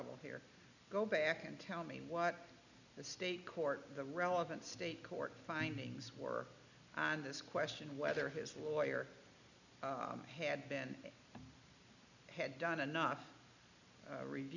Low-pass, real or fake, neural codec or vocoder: 7.2 kHz; real; none